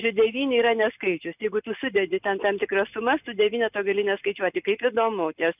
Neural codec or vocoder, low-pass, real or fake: none; 3.6 kHz; real